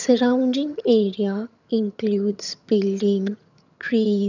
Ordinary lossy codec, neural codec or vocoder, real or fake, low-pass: none; vocoder, 22.05 kHz, 80 mel bands, HiFi-GAN; fake; 7.2 kHz